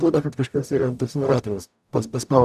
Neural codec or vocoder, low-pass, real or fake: codec, 44.1 kHz, 0.9 kbps, DAC; 14.4 kHz; fake